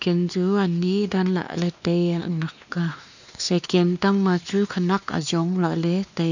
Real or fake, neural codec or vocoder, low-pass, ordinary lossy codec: fake; codec, 16 kHz, 2 kbps, X-Codec, WavLM features, trained on Multilingual LibriSpeech; 7.2 kHz; none